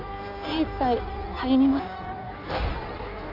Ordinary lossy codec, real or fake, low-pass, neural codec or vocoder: none; fake; 5.4 kHz; codec, 16 kHz in and 24 kHz out, 1.1 kbps, FireRedTTS-2 codec